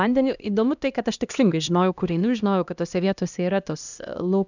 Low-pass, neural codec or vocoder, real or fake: 7.2 kHz; codec, 16 kHz, 1 kbps, X-Codec, HuBERT features, trained on LibriSpeech; fake